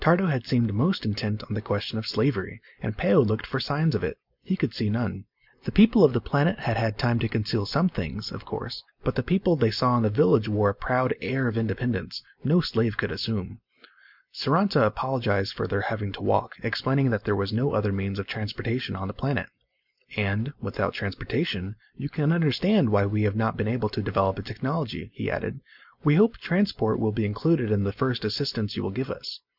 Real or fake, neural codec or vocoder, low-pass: real; none; 5.4 kHz